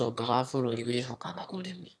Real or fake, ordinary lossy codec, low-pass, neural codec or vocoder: fake; none; none; autoencoder, 22.05 kHz, a latent of 192 numbers a frame, VITS, trained on one speaker